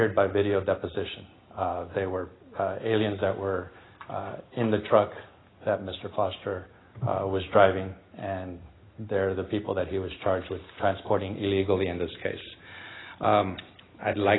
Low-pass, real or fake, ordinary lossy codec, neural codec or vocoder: 7.2 kHz; real; AAC, 16 kbps; none